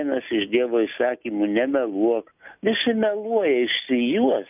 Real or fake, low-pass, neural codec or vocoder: real; 3.6 kHz; none